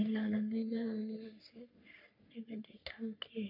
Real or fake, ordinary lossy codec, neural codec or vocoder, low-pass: fake; none; codec, 44.1 kHz, 3.4 kbps, Pupu-Codec; 5.4 kHz